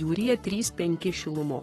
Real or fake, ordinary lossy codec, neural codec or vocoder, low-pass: fake; AAC, 32 kbps; codec, 44.1 kHz, 7.8 kbps, Pupu-Codec; 19.8 kHz